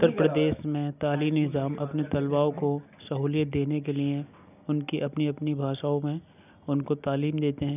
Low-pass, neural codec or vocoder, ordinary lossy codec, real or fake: 3.6 kHz; none; none; real